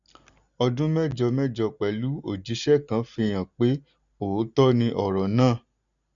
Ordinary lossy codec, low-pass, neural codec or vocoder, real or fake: none; 7.2 kHz; none; real